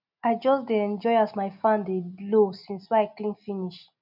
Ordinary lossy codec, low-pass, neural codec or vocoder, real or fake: none; 5.4 kHz; none; real